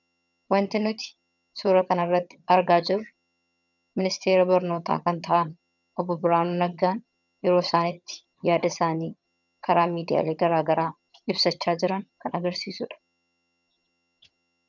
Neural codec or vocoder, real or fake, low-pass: vocoder, 22.05 kHz, 80 mel bands, HiFi-GAN; fake; 7.2 kHz